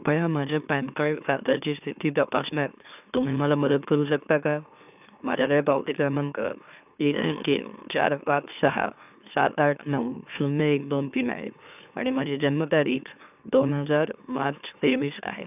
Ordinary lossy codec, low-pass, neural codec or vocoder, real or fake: none; 3.6 kHz; autoencoder, 44.1 kHz, a latent of 192 numbers a frame, MeloTTS; fake